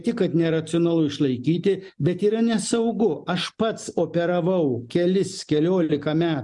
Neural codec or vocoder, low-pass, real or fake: none; 10.8 kHz; real